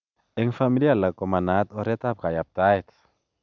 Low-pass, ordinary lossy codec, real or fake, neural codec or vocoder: 7.2 kHz; none; real; none